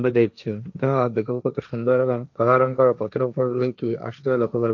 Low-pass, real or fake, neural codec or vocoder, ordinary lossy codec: 7.2 kHz; fake; codec, 16 kHz, 1.1 kbps, Voila-Tokenizer; none